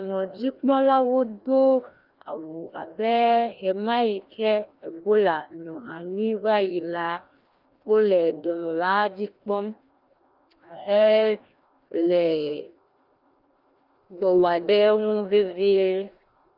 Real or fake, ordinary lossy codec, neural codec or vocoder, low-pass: fake; Opus, 32 kbps; codec, 16 kHz, 1 kbps, FreqCodec, larger model; 5.4 kHz